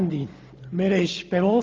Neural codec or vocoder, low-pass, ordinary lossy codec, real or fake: none; 7.2 kHz; Opus, 24 kbps; real